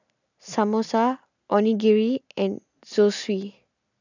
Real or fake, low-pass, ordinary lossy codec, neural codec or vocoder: real; 7.2 kHz; none; none